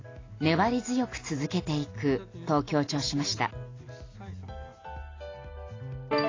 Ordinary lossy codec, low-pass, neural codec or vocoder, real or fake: AAC, 32 kbps; 7.2 kHz; none; real